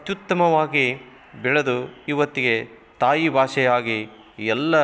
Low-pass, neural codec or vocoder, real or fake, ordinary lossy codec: none; none; real; none